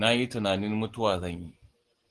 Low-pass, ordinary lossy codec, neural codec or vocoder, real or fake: 10.8 kHz; Opus, 16 kbps; none; real